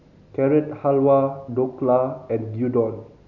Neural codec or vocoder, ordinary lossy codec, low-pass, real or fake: none; none; 7.2 kHz; real